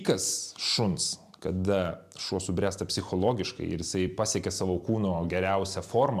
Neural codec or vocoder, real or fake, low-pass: vocoder, 44.1 kHz, 128 mel bands every 256 samples, BigVGAN v2; fake; 14.4 kHz